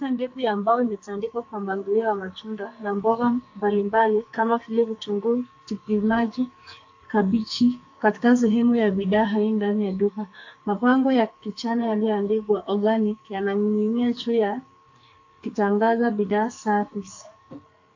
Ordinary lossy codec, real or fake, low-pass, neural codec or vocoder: AAC, 48 kbps; fake; 7.2 kHz; codec, 44.1 kHz, 2.6 kbps, SNAC